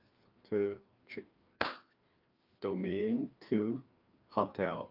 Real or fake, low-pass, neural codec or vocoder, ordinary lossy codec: fake; 5.4 kHz; codec, 16 kHz, 2 kbps, FreqCodec, larger model; Opus, 32 kbps